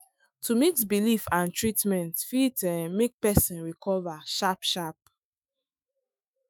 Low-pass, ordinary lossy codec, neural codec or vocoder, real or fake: none; none; autoencoder, 48 kHz, 128 numbers a frame, DAC-VAE, trained on Japanese speech; fake